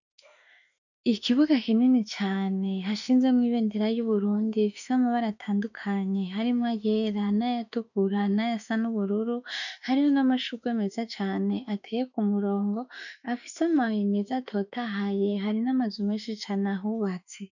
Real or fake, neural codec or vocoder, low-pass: fake; autoencoder, 48 kHz, 32 numbers a frame, DAC-VAE, trained on Japanese speech; 7.2 kHz